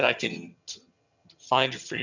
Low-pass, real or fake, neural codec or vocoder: 7.2 kHz; fake; vocoder, 22.05 kHz, 80 mel bands, HiFi-GAN